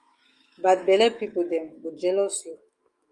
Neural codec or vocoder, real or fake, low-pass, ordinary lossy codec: autoencoder, 48 kHz, 128 numbers a frame, DAC-VAE, trained on Japanese speech; fake; 10.8 kHz; Opus, 32 kbps